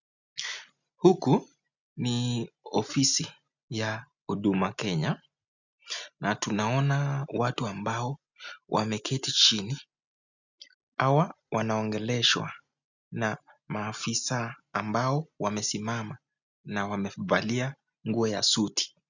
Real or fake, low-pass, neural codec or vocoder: real; 7.2 kHz; none